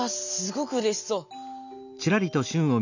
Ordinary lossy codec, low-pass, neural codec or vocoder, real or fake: none; 7.2 kHz; none; real